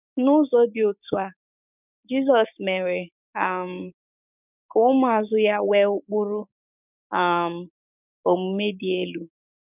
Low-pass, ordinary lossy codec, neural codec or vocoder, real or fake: 3.6 kHz; none; codec, 16 kHz, 6 kbps, DAC; fake